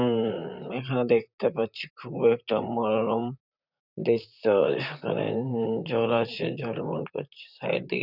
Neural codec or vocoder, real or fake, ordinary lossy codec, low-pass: vocoder, 44.1 kHz, 128 mel bands, Pupu-Vocoder; fake; none; 5.4 kHz